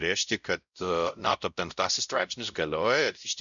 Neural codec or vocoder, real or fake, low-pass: codec, 16 kHz, 0.5 kbps, X-Codec, WavLM features, trained on Multilingual LibriSpeech; fake; 7.2 kHz